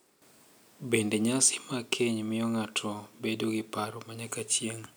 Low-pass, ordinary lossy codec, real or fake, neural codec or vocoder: none; none; real; none